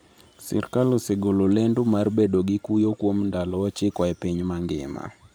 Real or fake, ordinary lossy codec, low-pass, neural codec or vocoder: fake; none; none; vocoder, 44.1 kHz, 128 mel bands every 512 samples, BigVGAN v2